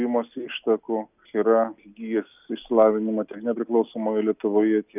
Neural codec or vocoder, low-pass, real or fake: none; 3.6 kHz; real